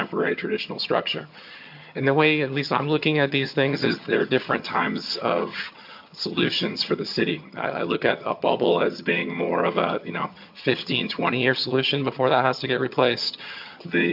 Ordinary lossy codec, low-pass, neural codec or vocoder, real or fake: MP3, 48 kbps; 5.4 kHz; vocoder, 22.05 kHz, 80 mel bands, HiFi-GAN; fake